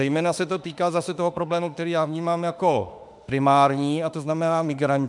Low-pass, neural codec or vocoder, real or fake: 10.8 kHz; autoencoder, 48 kHz, 32 numbers a frame, DAC-VAE, trained on Japanese speech; fake